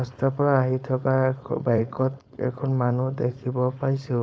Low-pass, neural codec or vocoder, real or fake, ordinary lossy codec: none; codec, 16 kHz, 4.8 kbps, FACodec; fake; none